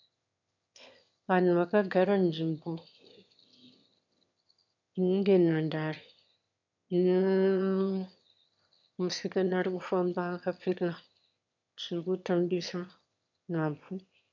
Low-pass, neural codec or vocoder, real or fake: 7.2 kHz; autoencoder, 22.05 kHz, a latent of 192 numbers a frame, VITS, trained on one speaker; fake